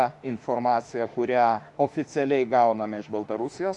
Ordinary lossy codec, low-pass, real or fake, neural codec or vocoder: Opus, 64 kbps; 10.8 kHz; fake; autoencoder, 48 kHz, 32 numbers a frame, DAC-VAE, trained on Japanese speech